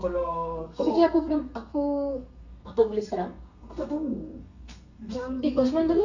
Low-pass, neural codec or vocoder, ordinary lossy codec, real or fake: 7.2 kHz; codec, 32 kHz, 1.9 kbps, SNAC; none; fake